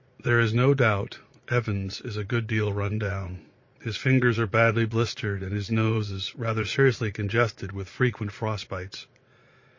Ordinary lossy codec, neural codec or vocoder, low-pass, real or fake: MP3, 32 kbps; vocoder, 22.05 kHz, 80 mel bands, Vocos; 7.2 kHz; fake